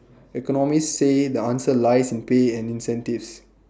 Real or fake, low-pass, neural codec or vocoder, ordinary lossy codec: real; none; none; none